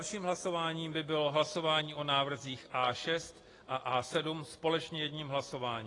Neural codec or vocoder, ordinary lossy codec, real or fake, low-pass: none; AAC, 32 kbps; real; 10.8 kHz